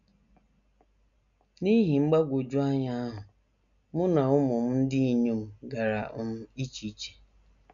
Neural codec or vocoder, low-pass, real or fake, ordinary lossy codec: none; 7.2 kHz; real; none